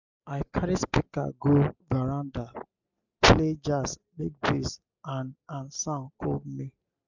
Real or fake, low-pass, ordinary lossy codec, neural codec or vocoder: real; 7.2 kHz; none; none